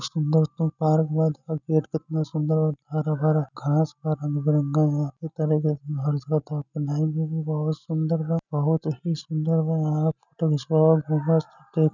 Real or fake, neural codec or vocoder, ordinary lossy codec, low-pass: real; none; none; 7.2 kHz